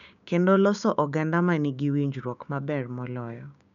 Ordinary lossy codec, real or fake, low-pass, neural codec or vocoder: none; fake; 7.2 kHz; codec, 16 kHz, 6 kbps, DAC